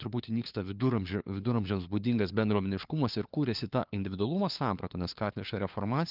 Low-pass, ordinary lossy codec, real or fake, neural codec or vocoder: 5.4 kHz; Opus, 32 kbps; fake; codec, 16 kHz, 4 kbps, FunCodec, trained on LibriTTS, 50 frames a second